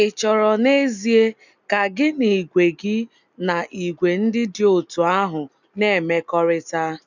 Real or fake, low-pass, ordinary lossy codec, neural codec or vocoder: real; 7.2 kHz; none; none